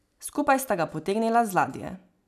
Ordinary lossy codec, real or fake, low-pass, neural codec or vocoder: none; real; 14.4 kHz; none